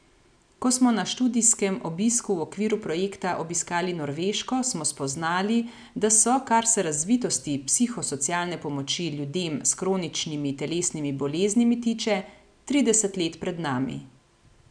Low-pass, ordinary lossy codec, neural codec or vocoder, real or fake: 9.9 kHz; none; none; real